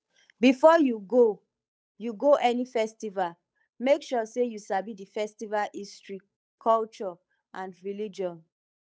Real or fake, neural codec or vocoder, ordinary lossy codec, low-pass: fake; codec, 16 kHz, 8 kbps, FunCodec, trained on Chinese and English, 25 frames a second; none; none